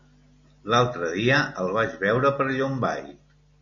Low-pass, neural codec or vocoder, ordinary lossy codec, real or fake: 7.2 kHz; none; MP3, 96 kbps; real